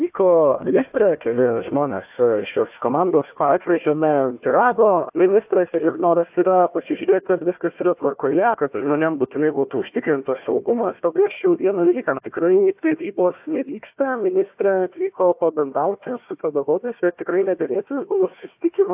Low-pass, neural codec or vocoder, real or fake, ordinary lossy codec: 3.6 kHz; codec, 16 kHz, 1 kbps, FunCodec, trained on Chinese and English, 50 frames a second; fake; AAC, 32 kbps